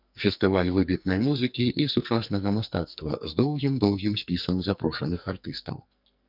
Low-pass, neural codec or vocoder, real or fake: 5.4 kHz; codec, 44.1 kHz, 2.6 kbps, SNAC; fake